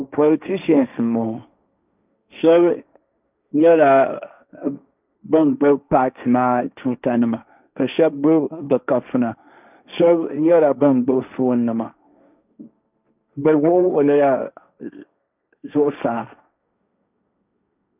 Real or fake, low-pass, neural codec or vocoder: fake; 3.6 kHz; codec, 16 kHz, 1.1 kbps, Voila-Tokenizer